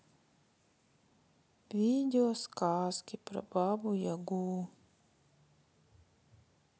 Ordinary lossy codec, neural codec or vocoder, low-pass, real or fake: none; none; none; real